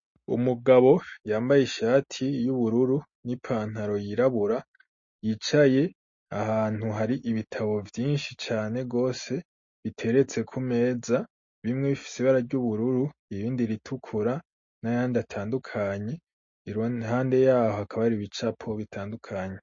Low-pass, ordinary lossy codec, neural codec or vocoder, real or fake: 7.2 kHz; MP3, 32 kbps; none; real